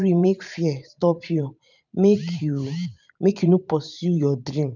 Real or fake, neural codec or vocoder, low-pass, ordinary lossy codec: fake; vocoder, 44.1 kHz, 128 mel bands every 512 samples, BigVGAN v2; 7.2 kHz; none